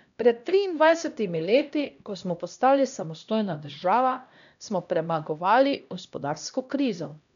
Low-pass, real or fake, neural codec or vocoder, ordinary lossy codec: 7.2 kHz; fake; codec, 16 kHz, 1 kbps, X-Codec, HuBERT features, trained on LibriSpeech; none